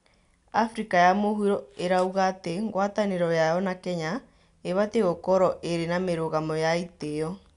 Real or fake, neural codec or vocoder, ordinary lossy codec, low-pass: real; none; none; 10.8 kHz